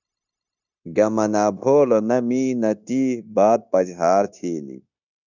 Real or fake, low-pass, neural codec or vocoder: fake; 7.2 kHz; codec, 16 kHz, 0.9 kbps, LongCat-Audio-Codec